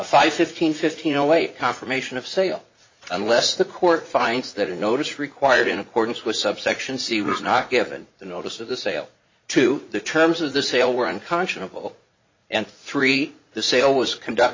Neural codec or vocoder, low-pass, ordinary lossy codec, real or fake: vocoder, 44.1 kHz, 80 mel bands, Vocos; 7.2 kHz; MP3, 32 kbps; fake